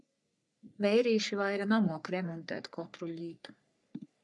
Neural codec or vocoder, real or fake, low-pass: codec, 44.1 kHz, 3.4 kbps, Pupu-Codec; fake; 10.8 kHz